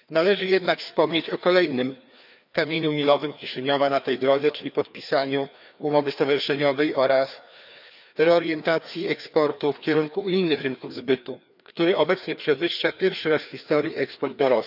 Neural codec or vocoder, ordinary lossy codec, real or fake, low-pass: codec, 16 kHz, 2 kbps, FreqCodec, larger model; none; fake; 5.4 kHz